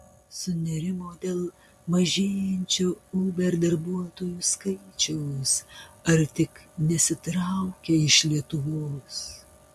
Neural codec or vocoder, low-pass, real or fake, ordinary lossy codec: none; 14.4 kHz; real; MP3, 64 kbps